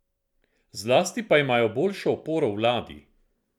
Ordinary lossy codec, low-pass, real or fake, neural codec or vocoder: none; 19.8 kHz; fake; vocoder, 44.1 kHz, 128 mel bands every 256 samples, BigVGAN v2